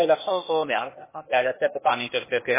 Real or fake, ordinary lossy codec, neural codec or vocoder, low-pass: fake; MP3, 16 kbps; codec, 16 kHz, 0.8 kbps, ZipCodec; 3.6 kHz